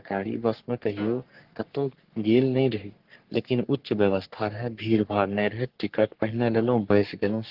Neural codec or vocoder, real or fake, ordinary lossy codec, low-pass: codec, 44.1 kHz, 3.4 kbps, Pupu-Codec; fake; Opus, 16 kbps; 5.4 kHz